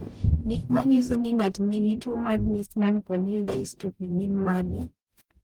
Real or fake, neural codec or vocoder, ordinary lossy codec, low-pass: fake; codec, 44.1 kHz, 0.9 kbps, DAC; Opus, 32 kbps; 19.8 kHz